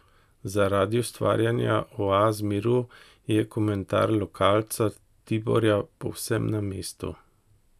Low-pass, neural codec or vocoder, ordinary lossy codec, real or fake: 14.4 kHz; none; none; real